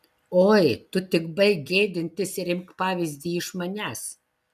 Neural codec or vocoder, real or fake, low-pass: none; real; 14.4 kHz